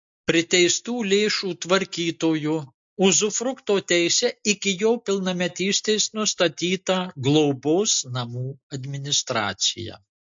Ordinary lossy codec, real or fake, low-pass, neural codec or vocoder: MP3, 48 kbps; real; 7.2 kHz; none